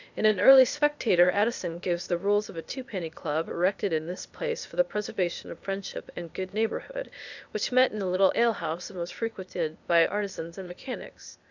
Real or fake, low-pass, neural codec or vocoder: fake; 7.2 kHz; codec, 16 kHz, 0.8 kbps, ZipCodec